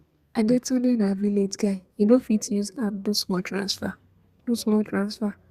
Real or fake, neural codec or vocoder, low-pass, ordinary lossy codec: fake; codec, 32 kHz, 1.9 kbps, SNAC; 14.4 kHz; Opus, 64 kbps